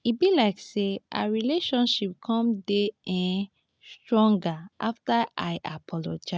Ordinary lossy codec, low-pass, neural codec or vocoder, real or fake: none; none; none; real